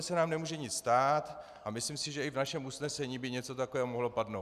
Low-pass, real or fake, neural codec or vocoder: 14.4 kHz; real; none